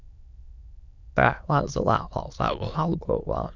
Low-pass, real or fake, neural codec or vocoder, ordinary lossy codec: 7.2 kHz; fake; autoencoder, 22.05 kHz, a latent of 192 numbers a frame, VITS, trained on many speakers; none